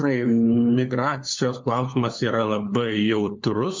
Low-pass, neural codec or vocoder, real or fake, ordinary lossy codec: 7.2 kHz; codec, 16 kHz, 4 kbps, FunCodec, trained on LibriTTS, 50 frames a second; fake; MP3, 64 kbps